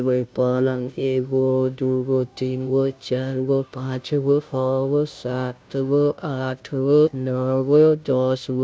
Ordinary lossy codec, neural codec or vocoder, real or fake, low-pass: none; codec, 16 kHz, 0.5 kbps, FunCodec, trained on Chinese and English, 25 frames a second; fake; none